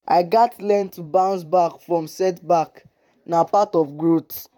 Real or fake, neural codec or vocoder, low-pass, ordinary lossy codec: fake; vocoder, 44.1 kHz, 128 mel bands, Pupu-Vocoder; 19.8 kHz; none